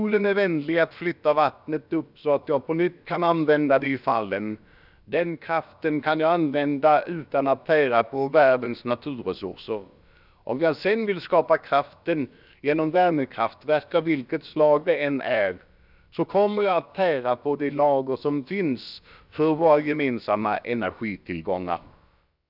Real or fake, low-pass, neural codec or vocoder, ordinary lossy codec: fake; 5.4 kHz; codec, 16 kHz, about 1 kbps, DyCAST, with the encoder's durations; none